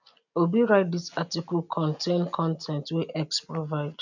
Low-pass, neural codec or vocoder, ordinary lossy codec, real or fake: 7.2 kHz; none; none; real